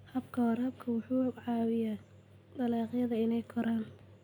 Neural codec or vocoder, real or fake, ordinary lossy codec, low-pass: none; real; none; 19.8 kHz